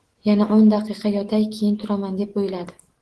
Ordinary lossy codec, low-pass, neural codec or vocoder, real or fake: Opus, 16 kbps; 10.8 kHz; autoencoder, 48 kHz, 128 numbers a frame, DAC-VAE, trained on Japanese speech; fake